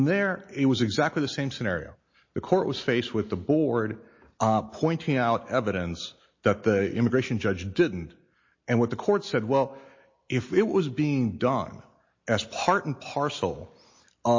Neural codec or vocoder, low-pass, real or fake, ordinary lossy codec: none; 7.2 kHz; real; MP3, 32 kbps